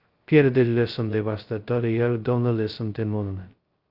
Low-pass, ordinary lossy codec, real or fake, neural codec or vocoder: 5.4 kHz; Opus, 32 kbps; fake; codec, 16 kHz, 0.2 kbps, FocalCodec